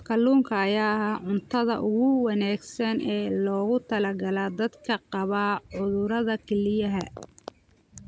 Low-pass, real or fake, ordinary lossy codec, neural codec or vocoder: none; real; none; none